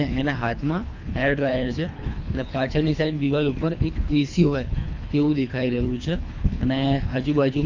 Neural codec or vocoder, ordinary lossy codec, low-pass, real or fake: codec, 24 kHz, 3 kbps, HILCodec; AAC, 48 kbps; 7.2 kHz; fake